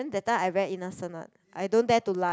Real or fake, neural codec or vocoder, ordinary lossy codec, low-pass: real; none; none; none